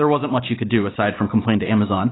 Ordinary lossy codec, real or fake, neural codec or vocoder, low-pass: AAC, 16 kbps; real; none; 7.2 kHz